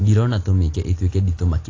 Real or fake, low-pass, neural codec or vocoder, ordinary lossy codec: real; 7.2 kHz; none; AAC, 32 kbps